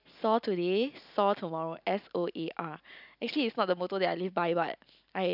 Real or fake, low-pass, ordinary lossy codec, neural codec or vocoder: real; 5.4 kHz; none; none